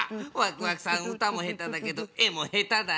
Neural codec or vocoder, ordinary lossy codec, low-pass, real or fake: none; none; none; real